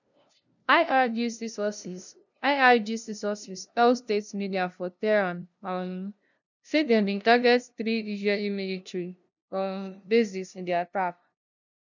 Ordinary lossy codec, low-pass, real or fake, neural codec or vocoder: none; 7.2 kHz; fake; codec, 16 kHz, 0.5 kbps, FunCodec, trained on LibriTTS, 25 frames a second